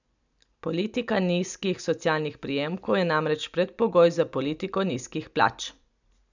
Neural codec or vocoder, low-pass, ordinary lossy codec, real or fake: none; 7.2 kHz; none; real